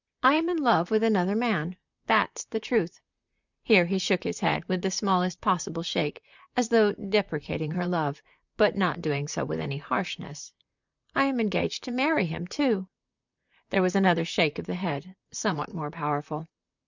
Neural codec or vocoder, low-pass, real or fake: vocoder, 44.1 kHz, 128 mel bands, Pupu-Vocoder; 7.2 kHz; fake